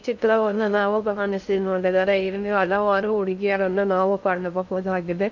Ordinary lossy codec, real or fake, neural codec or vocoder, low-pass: none; fake; codec, 16 kHz in and 24 kHz out, 0.6 kbps, FocalCodec, streaming, 2048 codes; 7.2 kHz